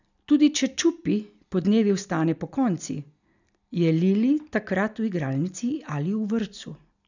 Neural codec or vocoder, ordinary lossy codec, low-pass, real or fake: none; none; 7.2 kHz; real